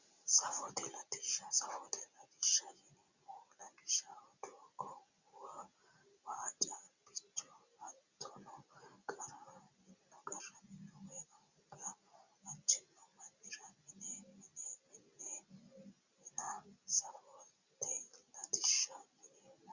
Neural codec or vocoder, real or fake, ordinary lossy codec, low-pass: none; real; Opus, 64 kbps; 7.2 kHz